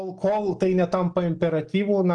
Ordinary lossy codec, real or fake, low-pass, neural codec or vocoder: Opus, 24 kbps; real; 10.8 kHz; none